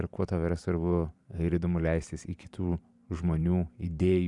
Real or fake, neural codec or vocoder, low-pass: fake; vocoder, 44.1 kHz, 128 mel bands every 512 samples, BigVGAN v2; 10.8 kHz